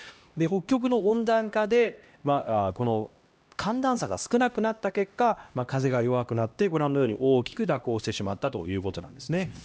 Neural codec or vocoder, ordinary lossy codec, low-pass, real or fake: codec, 16 kHz, 1 kbps, X-Codec, HuBERT features, trained on LibriSpeech; none; none; fake